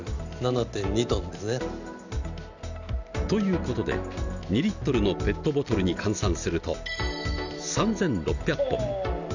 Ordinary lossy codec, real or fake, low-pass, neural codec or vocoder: none; real; 7.2 kHz; none